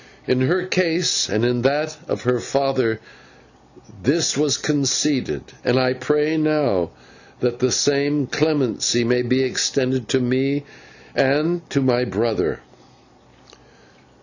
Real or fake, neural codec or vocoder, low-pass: real; none; 7.2 kHz